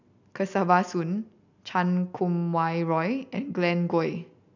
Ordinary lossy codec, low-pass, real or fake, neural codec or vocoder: none; 7.2 kHz; real; none